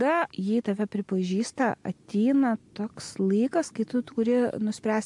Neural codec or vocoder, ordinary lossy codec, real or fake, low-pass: none; MP3, 64 kbps; real; 10.8 kHz